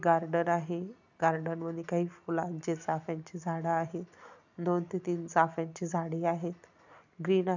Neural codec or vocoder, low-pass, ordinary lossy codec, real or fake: none; 7.2 kHz; none; real